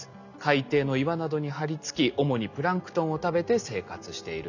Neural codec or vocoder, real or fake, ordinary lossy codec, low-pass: none; real; none; 7.2 kHz